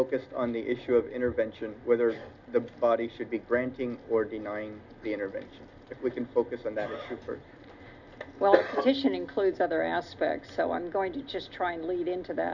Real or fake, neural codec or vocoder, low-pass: real; none; 7.2 kHz